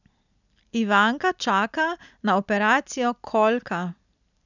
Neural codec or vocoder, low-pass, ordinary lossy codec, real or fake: none; 7.2 kHz; none; real